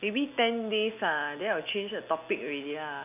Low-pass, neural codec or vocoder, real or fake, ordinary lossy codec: 3.6 kHz; none; real; none